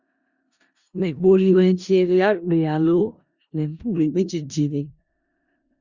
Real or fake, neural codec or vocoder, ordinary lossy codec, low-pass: fake; codec, 16 kHz in and 24 kHz out, 0.4 kbps, LongCat-Audio-Codec, four codebook decoder; Opus, 64 kbps; 7.2 kHz